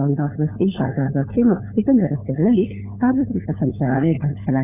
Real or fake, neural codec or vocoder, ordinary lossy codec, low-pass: fake; codec, 16 kHz, 4 kbps, FunCodec, trained on LibriTTS, 50 frames a second; none; 3.6 kHz